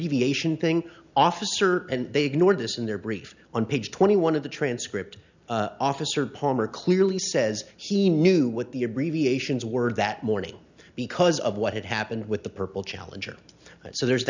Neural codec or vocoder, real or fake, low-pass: none; real; 7.2 kHz